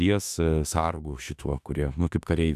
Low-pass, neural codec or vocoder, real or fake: 14.4 kHz; autoencoder, 48 kHz, 32 numbers a frame, DAC-VAE, trained on Japanese speech; fake